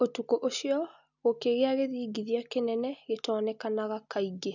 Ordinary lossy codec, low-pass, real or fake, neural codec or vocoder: none; 7.2 kHz; real; none